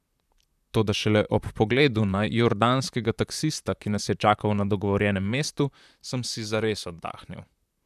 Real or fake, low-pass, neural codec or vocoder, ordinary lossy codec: fake; 14.4 kHz; vocoder, 44.1 kHz, 128 mel bands, Pupu-Vocoder; none